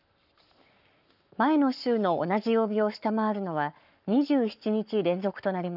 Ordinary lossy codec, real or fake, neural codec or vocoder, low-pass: none; fake; codec, 44.1 kHz, 7.8 kbps, Pupu-Codec; 5.4 kHz